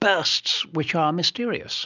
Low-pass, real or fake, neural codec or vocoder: 7.2 kHz; real; none